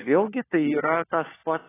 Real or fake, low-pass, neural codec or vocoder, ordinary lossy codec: fake; 3.6 kHz; codec, 16 kHz, 4 kbps, FunCodec, trained on LibriTTS, 50 frames a second; AAC, 16 kbps